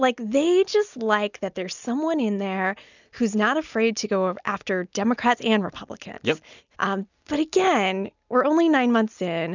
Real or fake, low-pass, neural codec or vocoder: real; 7.2 kHz; none